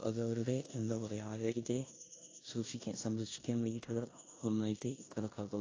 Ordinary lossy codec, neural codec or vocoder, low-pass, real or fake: AAC, 32 kbps; codec, 16 kHz in and 24 kHz out, 0.9 kbps, LongCat-Audio-Codec, four codebook decoder; 7.2 kHz; fake